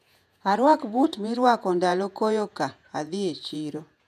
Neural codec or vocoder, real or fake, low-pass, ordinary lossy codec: vocoder, 48 kHz, 128 mel bands, Vocos; fake; 14.4 kHz; none